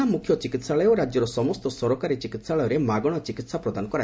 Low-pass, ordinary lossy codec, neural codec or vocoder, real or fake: none; none; none; real